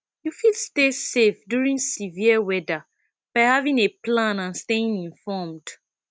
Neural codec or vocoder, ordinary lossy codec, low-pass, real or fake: none; none; none; real